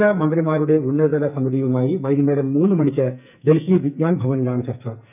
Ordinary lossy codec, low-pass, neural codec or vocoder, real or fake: none; 3.6 kHz; codec, 44.1 kHz, 2.6 kbps, SNAC; fake